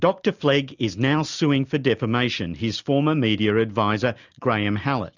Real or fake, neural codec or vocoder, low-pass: real; none; 7.2 kHz